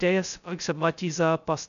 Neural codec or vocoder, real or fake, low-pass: codec, 16 kHz, 0.2 kbps, FocalCodec; fake; 7.2 kHz